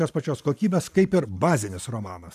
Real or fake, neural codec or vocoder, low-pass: fake; vocoder, 44.1 kHz, 128 mel bands every 512 samples, BigVGAN v2; 14.4 kHz